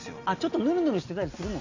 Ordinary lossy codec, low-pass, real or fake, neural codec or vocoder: AAC, 48 kbps; 7.2 kHz; real; none